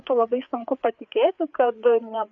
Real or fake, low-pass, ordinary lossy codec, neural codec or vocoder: fake; 7.2 kHz; MP3, 64 kbps; codec, 16 kHz, 4 kbps, FreqCodec, larger model